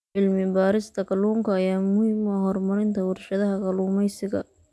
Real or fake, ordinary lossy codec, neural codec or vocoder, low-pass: real; none; none; none